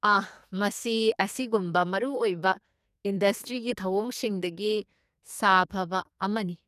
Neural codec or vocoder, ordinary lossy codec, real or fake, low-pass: codec, 44.1 kHz, 2.6 kbps, SNAC; none; fake; 14.4 kHz